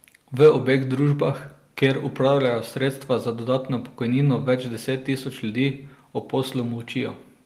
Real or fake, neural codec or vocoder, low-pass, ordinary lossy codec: real; none; 14.4 kHz; Opus, 24 kbps